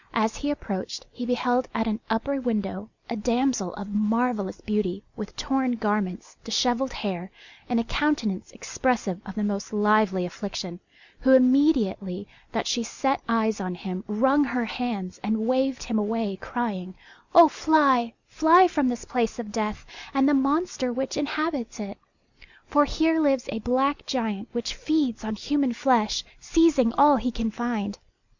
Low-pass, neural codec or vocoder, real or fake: 7.2 kHz; none; real